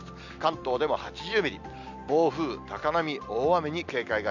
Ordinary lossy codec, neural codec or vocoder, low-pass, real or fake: none; none; 7.2 kHz; real